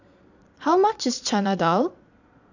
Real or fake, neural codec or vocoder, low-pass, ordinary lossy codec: fake; vocoder, 22.05 kHz, 80 mel bands, Vocos; 7.2 kHz; none